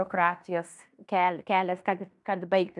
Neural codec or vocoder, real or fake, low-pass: codec, 16 kHz in and 24 kHz out, 0.9 kbps, LongCat-Audio-Codec, fine tuned four codebook decoder; fake; 10.8 kHz